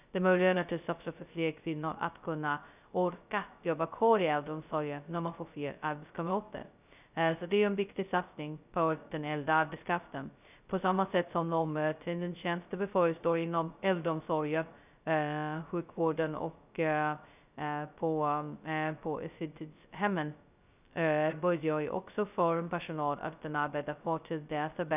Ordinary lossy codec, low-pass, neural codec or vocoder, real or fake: none; 3.6 kHz; codec, 16 kHz, 0.2 kbps, FocalCodec; fake